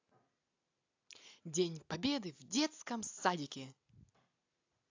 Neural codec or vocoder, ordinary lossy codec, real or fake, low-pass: none; AAC, 48 kbps; real; 7.2 kHz